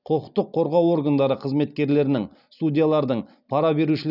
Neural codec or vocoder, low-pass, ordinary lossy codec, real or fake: none; 5.4 kHz; none; real